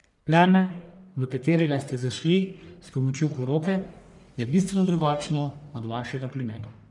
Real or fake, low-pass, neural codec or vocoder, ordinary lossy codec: fake; 10.8 kHz; codec, 44.1 kHz, 1.7 kbps, Pupu-Codec; none